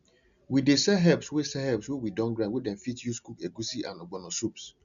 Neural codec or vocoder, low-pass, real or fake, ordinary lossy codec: none; 7.2 kHz; real; none